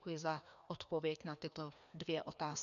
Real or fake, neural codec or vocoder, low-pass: fake; codec, 16 kHz, 2 kbps, FreqCodec, larger model; 7.2 kHz